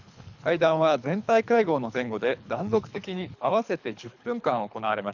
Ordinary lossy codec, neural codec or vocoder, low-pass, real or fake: none; codec, 24 kHz, 3 kbps, HILCodec; 7.2 kHz; fake